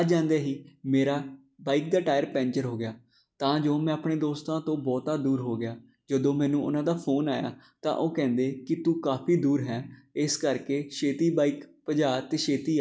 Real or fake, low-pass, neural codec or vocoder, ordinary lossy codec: real; none; none; none